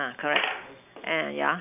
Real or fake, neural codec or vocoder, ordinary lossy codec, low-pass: real; none; none; 3.6 kHz